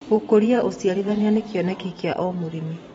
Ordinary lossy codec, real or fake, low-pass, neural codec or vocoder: AAC, 24 kbps; fake; 19.8 kHz; vocoder, 44.1 kHz, 128 mel bands every 512 samples, BigVGAN v2